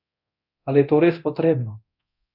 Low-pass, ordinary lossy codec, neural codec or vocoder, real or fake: 5.4 kHz; none; codec, 24 kHz, 0.9 kbps, DualCodec; fake